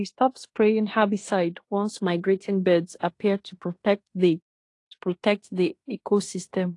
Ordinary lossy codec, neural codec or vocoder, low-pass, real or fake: AAC, 48 kbps; codec, 16 kHz in and 24 kHz out, 0.9 kbps, LongCat-Audio-Codec, fine tuned four codebook decoder; 10.8 kHz; fake